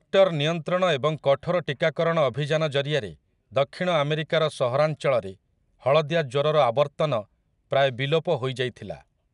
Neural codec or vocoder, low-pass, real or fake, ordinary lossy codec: none; 10.8 kHz; real; none